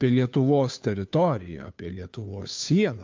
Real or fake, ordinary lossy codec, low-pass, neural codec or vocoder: fake; MP3, 64 kbps; 7.2 kHz; codec, 16 kHz, 2 kbps, FunCodec, trained on Chinese and English, 25 frames a second